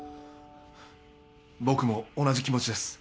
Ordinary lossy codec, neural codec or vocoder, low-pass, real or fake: none; none; none; real